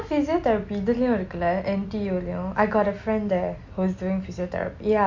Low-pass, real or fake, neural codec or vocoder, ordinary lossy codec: 7.2 kHz; real; none; MP3, 64 kbps